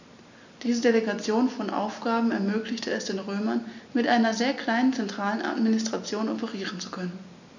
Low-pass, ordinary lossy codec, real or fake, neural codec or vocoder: 7.2 kHz; none; real; none